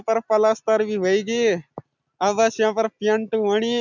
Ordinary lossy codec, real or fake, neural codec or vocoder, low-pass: none; real; none; 7.2 kHz